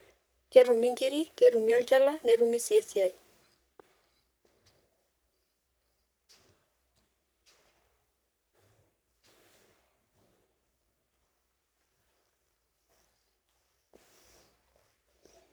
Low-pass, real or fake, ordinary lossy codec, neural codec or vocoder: none; fake; none; codec, 44.1 kHz, 3.4 kbps, Pupu-Codec